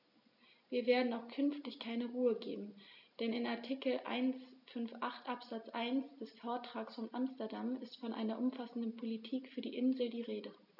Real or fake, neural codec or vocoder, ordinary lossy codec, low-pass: real; none; none; 5.4 kHz